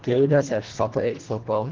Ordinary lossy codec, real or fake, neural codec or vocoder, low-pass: Opus, 16 kbps; fake; codec, 24 kHz, 1.5 kbps, HILCodec; 7.2 kHz